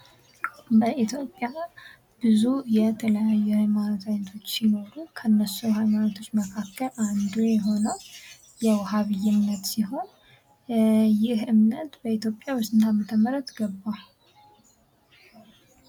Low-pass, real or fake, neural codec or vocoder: 19.8 kHz; real; none